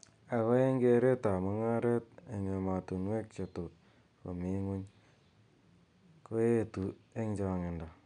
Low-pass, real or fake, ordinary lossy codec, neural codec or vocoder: 9.9 kHz; real; none; none